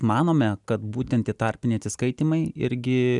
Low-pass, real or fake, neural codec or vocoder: 10.8 kHz; real; none